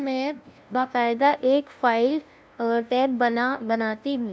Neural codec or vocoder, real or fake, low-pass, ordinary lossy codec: codec, 16 kHz, 0.5 kbps, FunCodec, trained on LibriTTS, 25 frames a second; fake; none; none